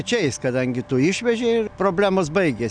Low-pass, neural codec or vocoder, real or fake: 9.9 kHz; none; real